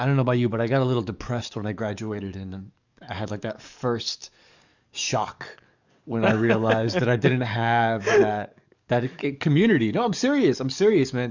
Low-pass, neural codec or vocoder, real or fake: 7.2 kHz; codec, 44.1 kHz, 7.8 kbps, DAC; fake